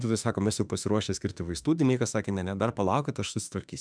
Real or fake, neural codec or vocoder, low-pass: fake; autoencoder, 48 kHz, 32 numbers a frame, DAC-VAE, trained on Japanese speech; 9.9 kHz